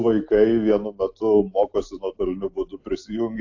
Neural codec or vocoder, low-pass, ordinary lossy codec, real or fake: none; 7.2 kHz; AAC, 48 kbps; real